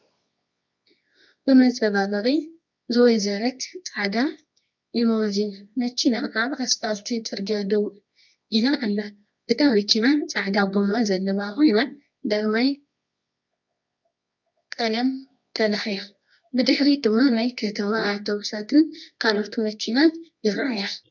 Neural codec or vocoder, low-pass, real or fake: codec, 24 kHz, 0.9 kbps, WavTokenizer, medium music audio release; 7.2 kHz; fake